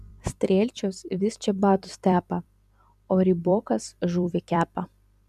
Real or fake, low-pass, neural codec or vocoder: fake; 14.4 kHz; vocoder, 44.1 kHz, 128 mel bands every 256 samples, BigVGAN v2